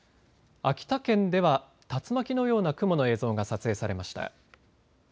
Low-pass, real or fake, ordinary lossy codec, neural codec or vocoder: none; real; none; none